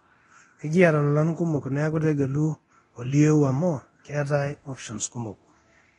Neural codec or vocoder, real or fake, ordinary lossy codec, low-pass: codec, 24 kHz, 0.9 kbps, DualCodec; fake; AAC, 32 kbps; 10.8 kHz